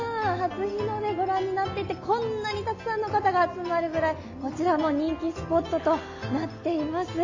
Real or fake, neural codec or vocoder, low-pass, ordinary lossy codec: real; none; 7.2 kHz; AAC, 48 kbps